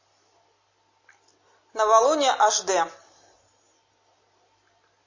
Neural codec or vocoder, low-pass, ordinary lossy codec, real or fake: none; 7.2 kHz; MP3, 32 kbps; real